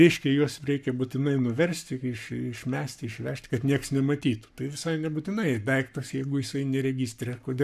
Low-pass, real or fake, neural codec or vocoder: 14.4 kHz; fake; codec, 44.1 kHz, 7.8 kbps, Pupu-Codec